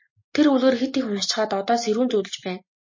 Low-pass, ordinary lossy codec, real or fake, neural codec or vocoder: 7.2 kHz; MP3, 32 kbps; real; none